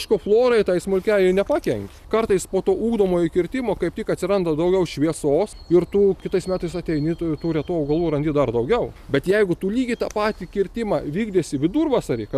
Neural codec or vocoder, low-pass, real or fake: none; 14.4 kHz; real